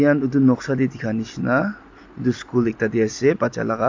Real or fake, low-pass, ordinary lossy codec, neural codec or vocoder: real; 7.2 kHz; AAC, 48 kbps; none